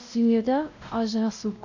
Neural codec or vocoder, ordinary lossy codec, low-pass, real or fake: codec, 16 kHz, 0.5 kbps, X-Codec, WavLM features, trained on Multilingual LibriSpeech; none; 7.2 kHz; fake